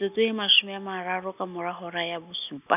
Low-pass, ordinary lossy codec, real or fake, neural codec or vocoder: 3.6 kHz; none; real; none